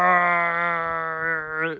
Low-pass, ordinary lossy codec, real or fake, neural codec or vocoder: none; none; real; none